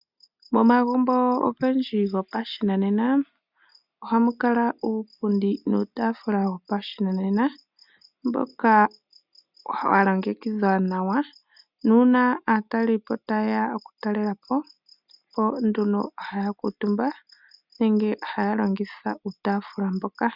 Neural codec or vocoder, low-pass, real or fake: none; 5.4 kHz; real